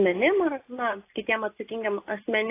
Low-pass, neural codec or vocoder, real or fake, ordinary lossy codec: 3.6 kHz; none; real; AAC, 24 kbps